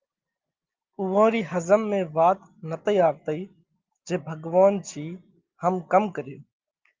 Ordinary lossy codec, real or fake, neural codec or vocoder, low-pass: Opus, 32 kbps; real; none; 7.2 kHz